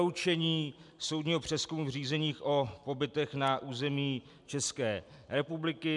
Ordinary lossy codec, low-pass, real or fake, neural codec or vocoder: MP3, 96 kbps; 10.8 kHz; real; none